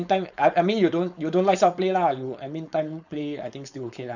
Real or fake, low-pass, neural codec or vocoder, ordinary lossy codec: fake; 7.2 kHz; codec, 16 kHz, 4.8 kbps, FACodec; none